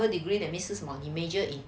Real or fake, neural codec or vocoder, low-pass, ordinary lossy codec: real; none; none; none